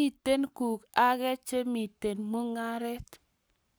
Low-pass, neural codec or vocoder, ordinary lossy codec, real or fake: none; codec, 44.1 kHz, 7.8 kbps, Pupu-Codec; none; fake